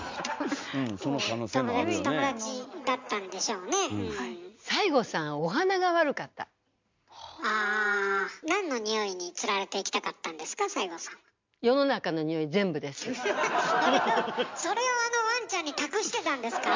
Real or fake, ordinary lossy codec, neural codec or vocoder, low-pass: real; MP3, 64 kbps; none; 7.2 kHz